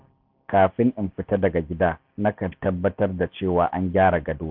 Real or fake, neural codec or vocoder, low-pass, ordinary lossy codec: real; none; 5.4 kHz; AAC, 48 kbps